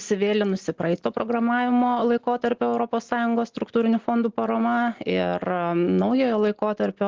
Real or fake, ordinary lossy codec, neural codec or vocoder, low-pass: real; Opus, 16 kbps; none; 7.2 kHz